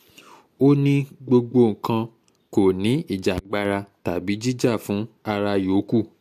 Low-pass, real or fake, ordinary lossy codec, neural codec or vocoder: 14.4 kHz; real; MP3, 64 kbps; none